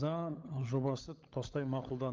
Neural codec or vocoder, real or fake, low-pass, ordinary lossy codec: codec, 16 kHz, 16 kbps, FunCodec, trained on LibriTTS, 50 frames a second; fake; 7.2 kHz; Opus, 32 kbps